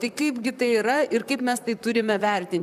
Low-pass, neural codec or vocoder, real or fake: 14.4 kHz; vocoder, 44.1 kHz, 128 mel bands, Pupu-Vocoder; fake